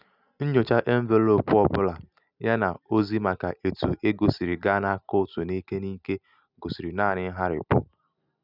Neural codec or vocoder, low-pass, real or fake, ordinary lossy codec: none; 5.4 kHz; real; none